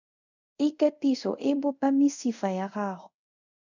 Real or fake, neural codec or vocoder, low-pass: fake; codec, 24 kHz, 0.5 kbps, DualCodec; 7.2 kHz